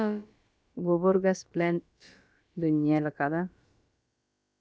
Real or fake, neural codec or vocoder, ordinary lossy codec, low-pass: fake; codec, 16 kHz, about 1 kbps, DyCAST, with the encoder's durations; none; none